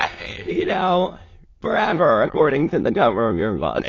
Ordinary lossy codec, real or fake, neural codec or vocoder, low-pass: AAC, 32 kbps; fake; autoencoder, 22.05 kHz, a latent of 192 numbers a frame, VITS, trained on many speakers; 7.2 kHz